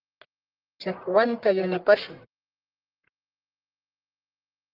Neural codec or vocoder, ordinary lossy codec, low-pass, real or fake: codec, 44.1 kHz, 1.7 kbps, Pupu-Codec; Opus, 32 kbps; 5.4 kHz; fake